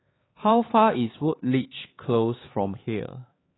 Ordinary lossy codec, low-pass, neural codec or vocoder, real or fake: AAC, 16 kbps; 7.2 kHz; codec, 16 kHz, 4 kbps, X-Codec, HuBERT features, trained on LibriSpeech; fake